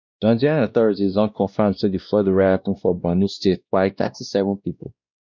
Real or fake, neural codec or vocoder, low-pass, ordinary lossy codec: fake; codec, 16 kHz, 1 kbps, X-Codec, WavLM features, trained on Multilingual LibriSpeech; 7.2 kHz; none